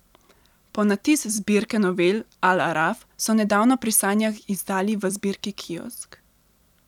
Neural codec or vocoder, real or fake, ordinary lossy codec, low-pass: vocoder, 44.1 kHz, 128 mel bands every 256 samples, BigVGAN v2; fake; none; 19.8 kHz